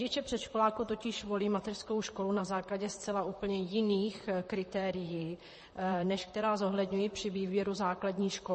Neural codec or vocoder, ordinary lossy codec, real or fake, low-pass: vocoder, 44.1 kHz, 128 mel bands, Pupu-Vocoder; MP3, 32 kbps; fake; 10.8 kHz